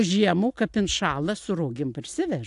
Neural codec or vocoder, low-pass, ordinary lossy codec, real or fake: none; 10.8 kHz; AAC, 64 kbps; real